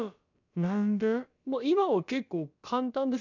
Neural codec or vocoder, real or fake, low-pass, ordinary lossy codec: codec, 16 kHz, about 1 kbps, DyCAST, with the encoder's durations; fake; 7.2 kHz; none